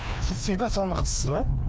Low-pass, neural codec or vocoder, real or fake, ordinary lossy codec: none; codec, 16 kHz, 2 kbps, FreqCodec, larger model; fake; none